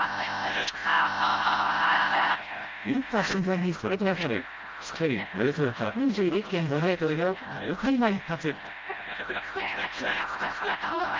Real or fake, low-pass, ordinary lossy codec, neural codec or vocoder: fake; 7.2 kHz; Opus, 32 kbps; codec, 16 kHz, 0.5 kbps, FreqCodec, smaller model